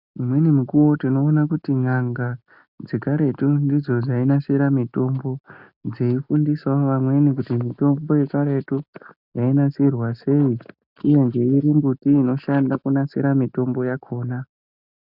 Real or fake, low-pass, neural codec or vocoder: real; 5.4 kHz; none